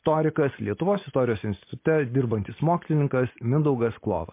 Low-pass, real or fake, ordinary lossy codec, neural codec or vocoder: 3.6 kHz; real; MP3, 24 kbps; none